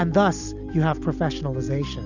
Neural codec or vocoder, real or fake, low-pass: none; real; 7.2 kHz